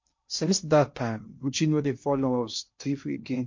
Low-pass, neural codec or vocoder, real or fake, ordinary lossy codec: 7.2 kHz; codec, 16 kHz in and 24 kHz out, 0.6 kbps, FocalCodec, streaming, 2048 codes; fake; MP3, 48 kbps